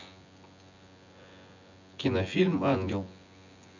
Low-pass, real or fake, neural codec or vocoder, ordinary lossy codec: 7.2 kHz; fake; vocoder, 24 kHz, 100 mel bands, Vocos; none